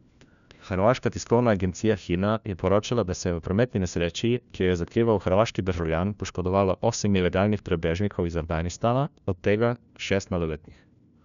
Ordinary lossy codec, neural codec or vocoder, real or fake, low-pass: none; codec, 16 kHz, 1 kbps, FunCodec, trained on LibriTTS, 50 frames a second; fake; 7.2 kHz